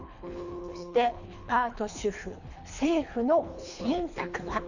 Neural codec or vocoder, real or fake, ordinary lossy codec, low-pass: codec, 24 kHz, 3 kbps, HILCodec; fake; none; 7.2 kHz